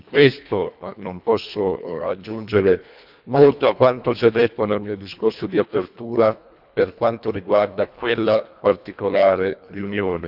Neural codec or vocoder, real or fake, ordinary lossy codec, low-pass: codec, 24 kHz, 1.5 kbps, HILCodec; fake; none; 5.4 kHz